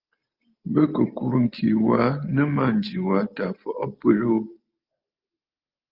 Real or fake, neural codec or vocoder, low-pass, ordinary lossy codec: real; none; 5.4 kHz; Opus, 24 kbps